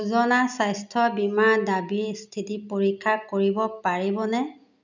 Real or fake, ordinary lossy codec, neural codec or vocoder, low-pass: real; none; none; 7.2 kHz